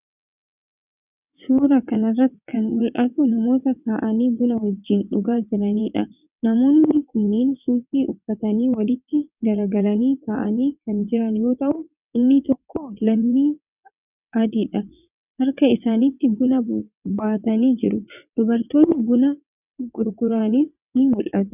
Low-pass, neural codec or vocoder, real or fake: 3.6 kHz; vocoder, 22.05 kHz, 80 mel bands, WaveNeXt; fake